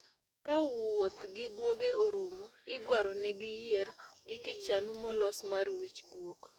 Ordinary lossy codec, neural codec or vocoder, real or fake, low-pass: none; codec, 44.1 kHz, 2.6 kbps, DAC; fake; 19.8 kHz